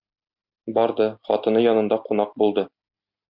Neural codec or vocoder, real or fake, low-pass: none; real; 5.4 kHz